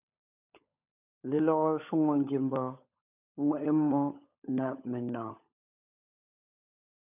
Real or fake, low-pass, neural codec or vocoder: fake; 3.6 kHz; codec, 16 kHz, 16 kbps, FunCodec, trained on LibriTTS, 50 frames a second